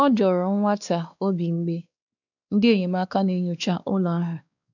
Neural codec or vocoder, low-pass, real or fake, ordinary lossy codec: codec, 16 kHz, 2 kbps, X-Codec, WavLM features, trained on Multilingual LibriSpeech; 7.2 kHz; fake; none